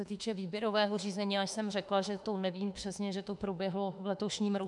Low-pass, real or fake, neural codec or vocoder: 10.8 kHz; fake; autoencoder, 48 kHz, 32 numbers a frame, DAC-VAE, trained on Japanese speech